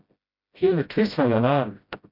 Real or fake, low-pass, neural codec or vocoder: fake; 5.4 kHz; codec, 16 kHz, 0.5 kbps, FreqCodec, smaller model